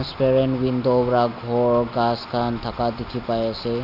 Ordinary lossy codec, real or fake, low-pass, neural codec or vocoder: none; real; 5.4 kHz; none